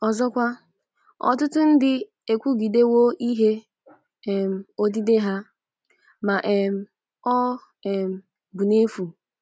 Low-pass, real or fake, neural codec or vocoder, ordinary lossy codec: none; real; none; none